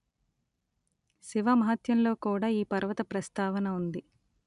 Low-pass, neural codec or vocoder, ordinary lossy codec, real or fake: 10.8 kHz; none; none; real